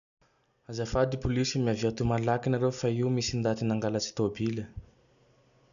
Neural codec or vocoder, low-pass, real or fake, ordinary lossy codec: none; 7.2 kHz; real; none